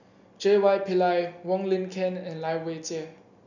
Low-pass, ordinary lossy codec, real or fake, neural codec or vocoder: 7.2 kHz; none; real; none